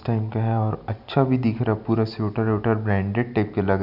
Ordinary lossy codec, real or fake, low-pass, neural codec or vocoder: none; real; 5.4 kHz; none